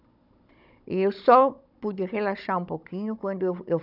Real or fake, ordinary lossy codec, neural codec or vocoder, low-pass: fake; none; codec, 16 kHz, 16 kbps, FunCodec, trained on Chinese and English, 50 frames a second; 5.4 kHz